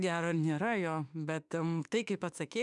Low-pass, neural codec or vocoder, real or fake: 10.8 kHz; autoencoder, 48 kHz, 32 numbers a frame, DAC-VAE, trained on Japanese speech; fake